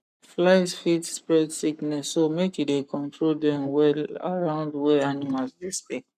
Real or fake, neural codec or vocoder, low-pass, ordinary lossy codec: fake; codec, 44.1 kHz, 7.8 kbps, Pupu-Codec; 14.4 kHz; none